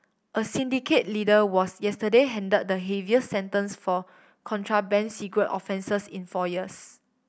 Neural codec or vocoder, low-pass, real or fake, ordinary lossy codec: none; none; real; none